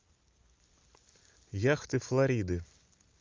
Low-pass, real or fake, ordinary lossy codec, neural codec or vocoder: 7.2 kHz; real; Opus, 24 kbps; none